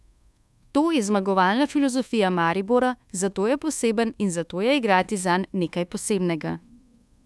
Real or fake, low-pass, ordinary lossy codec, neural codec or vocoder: fake; none; none; codec, 24 kHz, 1.2 kbps, DualCodec